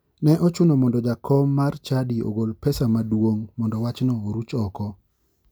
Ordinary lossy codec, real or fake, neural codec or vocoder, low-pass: none; real; none; none